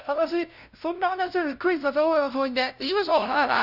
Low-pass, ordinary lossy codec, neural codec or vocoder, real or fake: 5.4 kHz; none; codec, 16 kHz, 0.5 kbps, FunCodec, trained on LibriTTS, 25 frames a second; fake